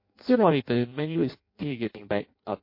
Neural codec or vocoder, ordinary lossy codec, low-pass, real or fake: codec, 16 kHz in and 24 kHz out, 0.6 kbps, FireRedTTS-2 codec; MP3, 32 kbps; 5.4 kHz; fake